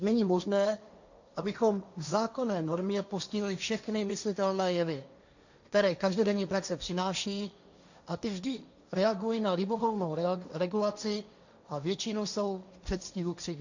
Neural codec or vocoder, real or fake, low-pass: codec, 16 kHz, 1.1 kbps, Voila-Tokenizer; fake; 7.2 kHz